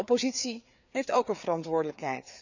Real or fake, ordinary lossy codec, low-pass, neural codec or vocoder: fake; none; 7.2 kHz; codec, 16 kHz, 4 kbps, FreqCodec, larger model